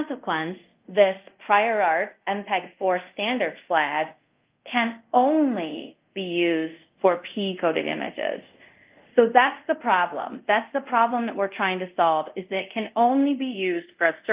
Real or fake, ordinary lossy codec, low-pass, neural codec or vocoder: fake; Opus, 24 kbps; 3.6 kHz; codec, 24 kHz, 0.5 kbps, DualCodec